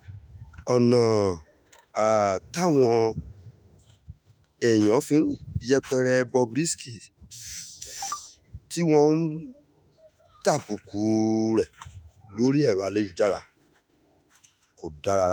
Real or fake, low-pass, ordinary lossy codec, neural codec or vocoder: fake; none; none; autoencoder, 48 kHz, 32 numbers a frame, DAC-VAE, trained on Japanese speech